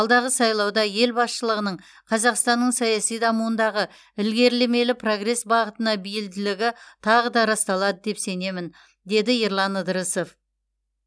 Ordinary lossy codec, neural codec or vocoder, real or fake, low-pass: none; none; real; none